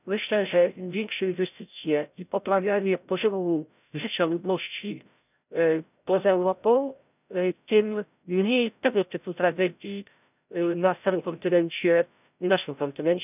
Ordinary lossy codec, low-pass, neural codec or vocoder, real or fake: none; 3.6 kHz; codec, 16 kHz, 0.5 kbps, FreqCodec, larger model; fake